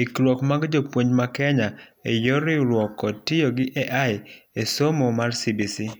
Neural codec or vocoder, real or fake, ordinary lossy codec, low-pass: none; real; none; none